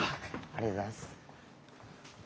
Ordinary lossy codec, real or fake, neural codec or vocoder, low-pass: none; real; none; none